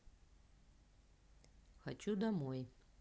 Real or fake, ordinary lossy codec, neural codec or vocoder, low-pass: real; none; none; none